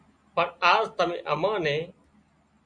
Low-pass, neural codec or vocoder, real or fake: 9.9 kHz; none; real